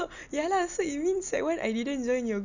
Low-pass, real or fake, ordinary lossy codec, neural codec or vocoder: 7.2 kHz; real; none; none